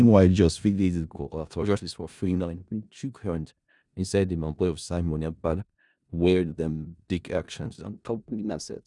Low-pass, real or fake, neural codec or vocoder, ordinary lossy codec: 10.8 kHz; fake; codec, 16 kHz in and 24 kHz out, 0.4 kbps, LongCat-Audio-Codec, four codebook decoder; none